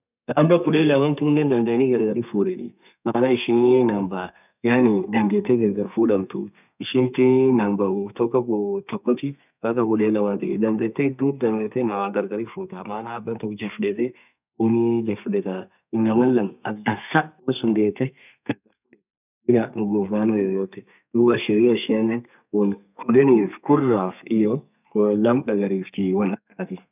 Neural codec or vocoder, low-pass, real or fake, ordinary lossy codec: codec, 32 kHz, 1.9 kbps, SNAC; 3.6 kHz; fake; none